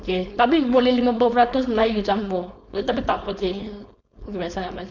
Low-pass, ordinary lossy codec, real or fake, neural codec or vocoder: 7.2 kHz; none; fake; codec, 16 kHz, 4.8 kbps, FACodec